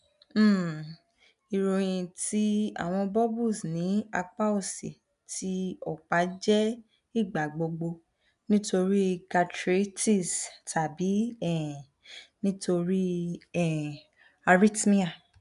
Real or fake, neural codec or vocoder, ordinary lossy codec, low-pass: real; none; none; 10.8 kHz